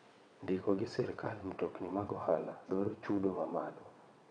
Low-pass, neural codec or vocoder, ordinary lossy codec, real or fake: 9.9 kHz; vocoder, 22.05 kHz, 80 mel bands, WaveNeXt; none; fake